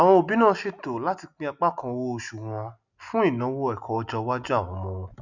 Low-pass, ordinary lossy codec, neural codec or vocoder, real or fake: 7.2 kHz; none; none; real